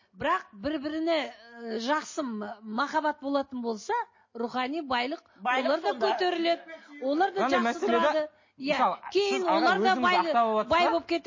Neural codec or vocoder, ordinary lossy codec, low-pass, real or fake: none; MP3, 32 kbps; 7.2 kHz; real